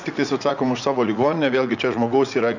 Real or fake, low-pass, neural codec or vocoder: fake; 7.2 kHz; vocoder, 44.1 kHz, 80 mel bands, Vocos